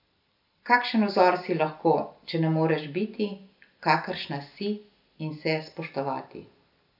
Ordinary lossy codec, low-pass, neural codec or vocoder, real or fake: none; 5.4 kHz; none; real